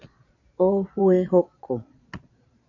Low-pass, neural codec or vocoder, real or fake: 7.2 kHz; vocoder, 22.05 kHz, 80 mel bands, Vocos; fake